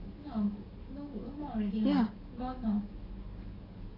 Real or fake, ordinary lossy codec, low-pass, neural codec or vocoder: fake; none; 5.4 kHz; codec, 44.1 kHz, 7.8 kbps, DAC